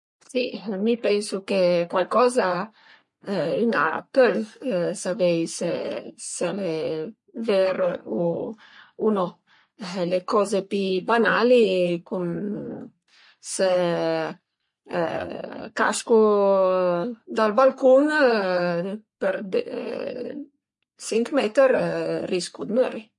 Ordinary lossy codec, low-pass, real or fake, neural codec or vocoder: MP3, 48 kbps; 10.8 kHz; fake; codec, 44.1 kHz, 3.4 kbps, Pupu-Codec